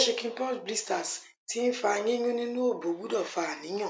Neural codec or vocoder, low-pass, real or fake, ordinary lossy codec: none; none; real; none